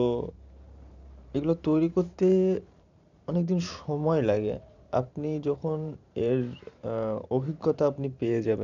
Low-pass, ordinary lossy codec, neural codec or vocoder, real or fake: 7.2 kHz; none; vocoder, 44.1 kHz, 128 mel bands every 512 samples, BigVGAN v2; fake